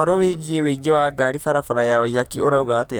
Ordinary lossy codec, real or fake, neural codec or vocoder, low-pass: none; fake; codec, 44.1 kHz, 2.6 kbps, SNAC; none